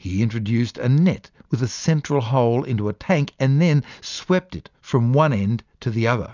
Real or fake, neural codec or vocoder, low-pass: real; none; 7.2 kHz